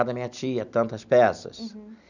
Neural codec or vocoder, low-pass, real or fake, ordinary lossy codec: none; 7.2 kHz; real; none